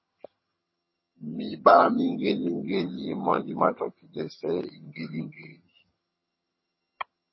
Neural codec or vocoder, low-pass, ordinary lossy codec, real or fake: vocoder, 22.05 kHz, 80 mel bands, HiFi-GAN; 7.2 kHz; MP3, 24 kbps; fake